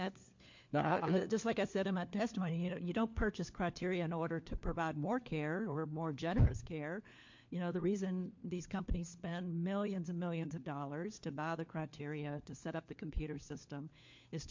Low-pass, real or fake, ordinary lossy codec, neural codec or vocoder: 7.2 kHz; fake; MP3, 48 kbps; codec, 16 kHz, 4 kbps, FunCodec, trained on LibriTTS, 50 frames a second